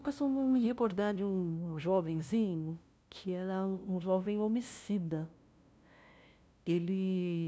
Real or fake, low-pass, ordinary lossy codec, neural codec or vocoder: fake; none; none; codec, 16 kHz, 0.5 kbps, FunCodec, trained on LibriTTS, 25 frames a second